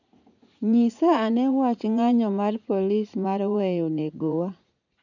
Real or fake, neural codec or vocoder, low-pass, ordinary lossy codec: fake; vocoder, 44.1 kHz, 80 mel bands, Vocos; 7.2 kHz; none